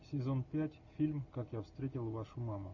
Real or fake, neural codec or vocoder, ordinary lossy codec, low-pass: real; none; MP3, 48 kbps; 7.2 kHz